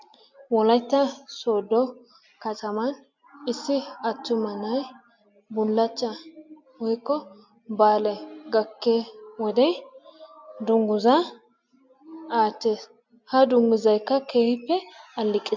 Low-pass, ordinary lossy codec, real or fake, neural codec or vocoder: 7.2 kHz; MP3, 64 kbps; real; none